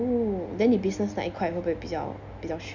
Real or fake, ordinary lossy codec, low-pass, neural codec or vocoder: real; none; 7.2 kHz; none